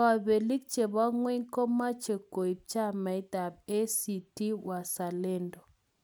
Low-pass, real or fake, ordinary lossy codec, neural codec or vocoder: none; real; none; none